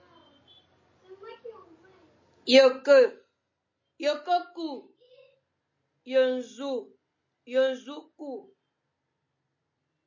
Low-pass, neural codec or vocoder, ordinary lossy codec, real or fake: 7.2 kHz; none; MP3, 32 kbps; real